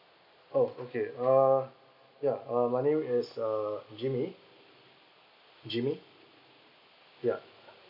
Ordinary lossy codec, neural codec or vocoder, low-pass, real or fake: none; none; 5.4 kHz; real